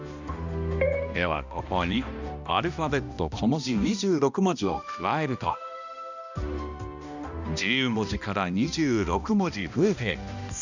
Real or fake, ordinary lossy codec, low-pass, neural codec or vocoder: fake; none; 7.2 kHz; codec, 16 kHz, 1 kbps, X-Codec, HuBERT features, trained on balanced general audio